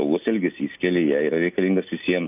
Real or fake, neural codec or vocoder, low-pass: real; none; 3.6 kHz